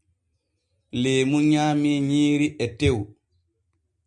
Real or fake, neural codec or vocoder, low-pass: real; none; 10.8 kHz